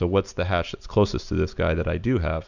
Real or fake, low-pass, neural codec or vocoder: real; 7.2 kHz; none